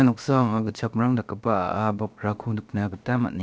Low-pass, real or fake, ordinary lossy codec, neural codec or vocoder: none; fake; none; codec, 16 kHz, about 1 kbps, DyCAST, with the encoder's durations